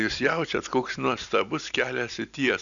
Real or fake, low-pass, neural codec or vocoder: real; 7.2 kHz; none